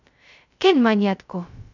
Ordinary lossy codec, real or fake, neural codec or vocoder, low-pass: none; fake; codec, 16 kHz, 0.2 kbps, FocalCodec; 7.2 kHz